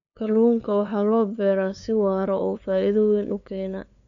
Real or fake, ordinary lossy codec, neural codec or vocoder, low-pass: fake; none; codec, 16 kHz, 2 kbps, FunCodec, trained on LibriTTS, 25 frames a second; 7.2 kHz